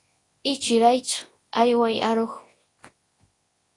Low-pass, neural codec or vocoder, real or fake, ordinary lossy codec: 10.8 kHz; codec, 24 kHz, 0.9 kbps, WavTokenizer, large speech release; fake; AAC, 32 kbps